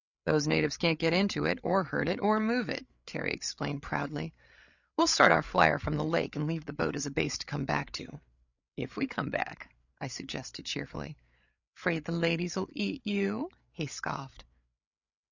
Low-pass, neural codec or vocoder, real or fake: 7.2 kHz; codec, 16 kHz, 8 kbps, FreqCodec, larger model; fake